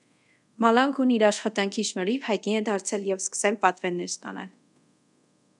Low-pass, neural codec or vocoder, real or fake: 10.8 kHz; codec, 24 kHz, 0.5 kbps, DualCodec; fake